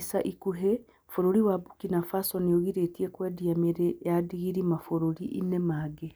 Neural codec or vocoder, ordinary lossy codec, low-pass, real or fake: none; none; none; real